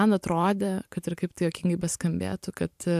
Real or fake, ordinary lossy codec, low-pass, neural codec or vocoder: fake; AAC, 96 kbps; 14.4 kHz; vocoder, 44.1 kHz, 128 mel bands every 512 samples, BigVGAN v2